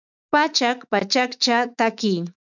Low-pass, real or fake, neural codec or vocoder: 7.2 kHz; fake; autoencoder, 48 kHz, 128 numbers a frame, DAC-VAE, trained on Japanese speech